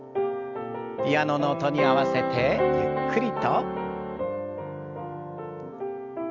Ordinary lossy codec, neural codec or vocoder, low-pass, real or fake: Opus, 64 kbps; none; 7.2 kHz; real